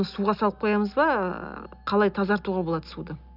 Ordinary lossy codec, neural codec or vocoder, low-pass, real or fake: none; none; 5.4 kHz; real